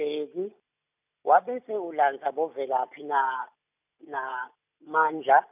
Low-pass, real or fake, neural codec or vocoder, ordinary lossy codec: 3.6 kHz; real; none; none